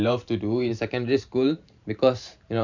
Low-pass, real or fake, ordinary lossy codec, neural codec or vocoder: 7.2 kHz; fake; none; vocoder, 44.1 kHz, 128 mel bands every 512 samples, BigVGAN v2